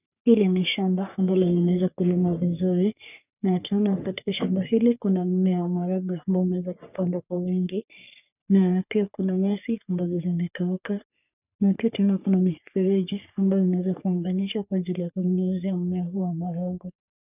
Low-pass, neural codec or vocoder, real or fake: 3.6 kHz; codec, 44.1 kHz, 3.4 kbps, Pupu-Codec; fake